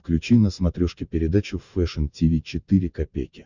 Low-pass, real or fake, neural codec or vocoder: 7.2 kHz; fake; vocoder, 44.1 kHz, 128 mel bands every 512 samples, BigVGAN v2